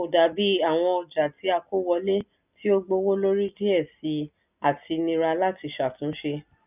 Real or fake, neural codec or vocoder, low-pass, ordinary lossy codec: real; none; 3.6 kHz; none